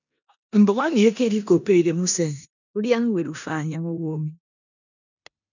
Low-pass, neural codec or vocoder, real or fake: 7.2 kHz; codec, 16 kHz in and 24 kHz out, 0.9 kbps, LongCat-Audio-Codec, four codebook decoder; fake